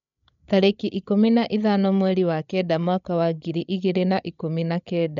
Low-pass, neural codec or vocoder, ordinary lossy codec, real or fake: 7.2 kHz; codec, 16 kHz, 16 kbps, FreqCodec, larger model; none; fake